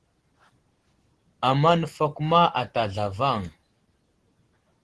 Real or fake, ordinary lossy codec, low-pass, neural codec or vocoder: real; Opus, 16 kbps; 10.8 kHz; none